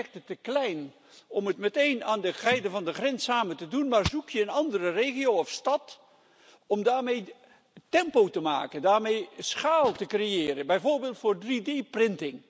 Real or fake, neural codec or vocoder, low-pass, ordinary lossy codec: real; none; none; none